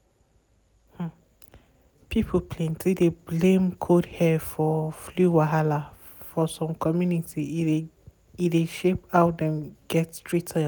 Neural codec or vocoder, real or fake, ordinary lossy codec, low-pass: none; real; none; none